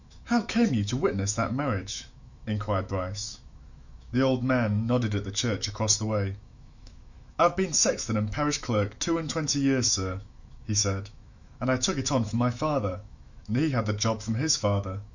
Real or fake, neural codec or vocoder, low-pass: fake; autoencoder, 48 kHz, 128 numbers a frame, DAC-VAE, trained on Japanese speech; 7.2 kHz